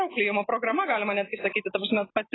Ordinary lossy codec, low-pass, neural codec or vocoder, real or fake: AAC, 16 kbps; 7.2 kHz; none; real